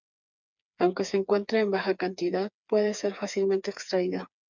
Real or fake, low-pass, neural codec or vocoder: fake; 7.2 kHz; codec, 16 kHz, 4 kbps, FreqCodec, smaller model